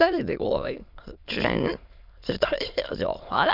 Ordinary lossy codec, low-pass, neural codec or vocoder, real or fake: MP3, 48 kbps; 5.4 kHz; autoencoder, 22.05 kHz, a latent of 192 numbers a frame, VITS, trained on many speakers; fake